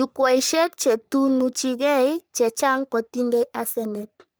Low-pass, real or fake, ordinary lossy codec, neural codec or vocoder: none; fake; none; codec, 44.1 kHz, 3.4 kbps, Pupu-Codec